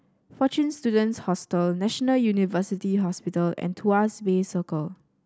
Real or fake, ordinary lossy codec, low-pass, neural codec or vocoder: real; none; none; none